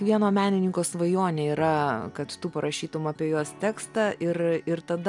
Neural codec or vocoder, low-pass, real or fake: none; 10.8 kHz; real